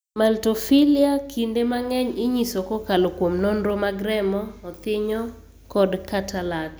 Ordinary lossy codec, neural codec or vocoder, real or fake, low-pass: none; none; real; none